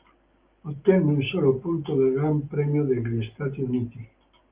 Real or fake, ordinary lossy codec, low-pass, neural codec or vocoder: real; Opus, 32 kbps; 3.6 kHz; none